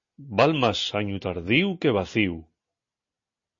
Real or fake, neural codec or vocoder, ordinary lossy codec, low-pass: real; none; MP3, 32 kbps; 7.2 kHz